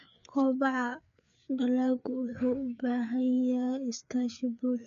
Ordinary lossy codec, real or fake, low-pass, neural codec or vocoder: none; fake; 7.2 kHz; codec, 16 kHz, 4 kbps, FreqCodec, larger model